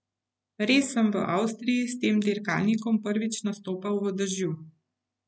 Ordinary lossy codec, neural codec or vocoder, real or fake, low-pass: none; none; real; none